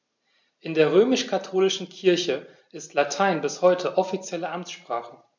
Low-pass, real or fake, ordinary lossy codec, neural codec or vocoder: 7.2 kHz; real; MP3, 64 kbps; none